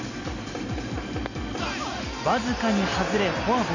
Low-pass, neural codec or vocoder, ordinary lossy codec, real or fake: 7.2 kHz; none; none; real